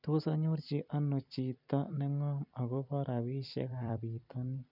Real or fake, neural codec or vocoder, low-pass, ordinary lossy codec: fake; vocoder, 44.1 kHz, 128 mel bands every 256 samples, BigVGAN v2; 5.4 kHz; none